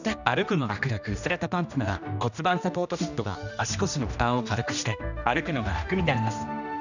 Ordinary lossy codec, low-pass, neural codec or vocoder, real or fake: none; 7.2 kHz; codec, 16 kHz, 1 kbps, X-Codec, HuBERT features, trained on general audio; fake